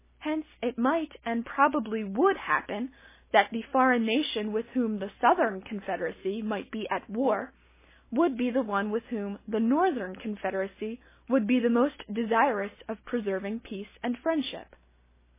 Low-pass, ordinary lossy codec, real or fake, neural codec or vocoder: 3.6 kHz; MP3, 16 kbps; real; none